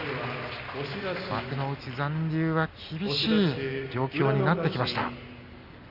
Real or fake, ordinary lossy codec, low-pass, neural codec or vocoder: real; AAC, 48 kbps; 5.4 kHz; none